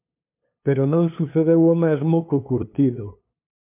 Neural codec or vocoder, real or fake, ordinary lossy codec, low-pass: codec, 16 kHz, 2 kbps, FunCodec, trained on LibriTTS, 25 frames a second; fake; MP3, 32 kbps; 3.6 kHz